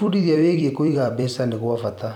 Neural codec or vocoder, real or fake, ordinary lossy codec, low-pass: vocoder, 44.1 kHz, 128 mel bands every 256 samples, BigVGAN v2; fake; none; 19.8 kHz